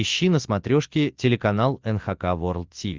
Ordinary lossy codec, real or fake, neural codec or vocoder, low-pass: Opus, 32 kbps; real; none; 7.2 kHz